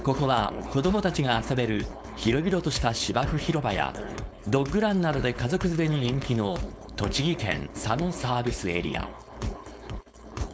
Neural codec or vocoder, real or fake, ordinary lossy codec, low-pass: codec, 16 kHz, 4.8 kbps, FACodec; fake; none; none